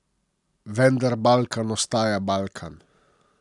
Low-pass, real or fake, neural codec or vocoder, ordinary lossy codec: 10.8 kHz; real; none; none